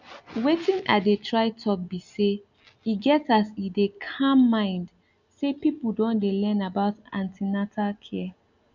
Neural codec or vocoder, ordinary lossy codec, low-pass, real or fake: none; none; 7.2 kHz; real